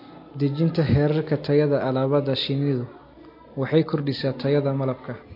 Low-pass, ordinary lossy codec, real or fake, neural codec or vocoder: 5.4 kHz; MP3, 32 kbps; real; none